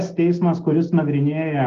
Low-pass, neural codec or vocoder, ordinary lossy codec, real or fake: 7.2 kHz; none; Opus, 16 kbps; real